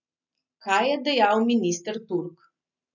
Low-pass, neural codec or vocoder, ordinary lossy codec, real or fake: 7.2 kHz; none; none; real